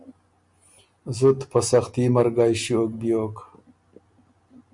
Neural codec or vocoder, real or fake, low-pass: none; real; 10.8 kHz